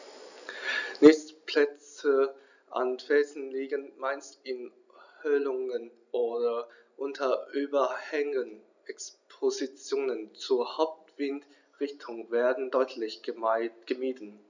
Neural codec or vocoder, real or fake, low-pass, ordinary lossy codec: none; real; 7.2 kHz; none